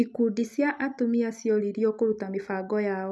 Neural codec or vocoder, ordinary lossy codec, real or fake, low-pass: none; none; real; none